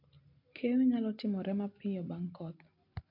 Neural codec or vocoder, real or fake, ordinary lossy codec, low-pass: none; real; MP3, 32 kbps; 5.4 kHz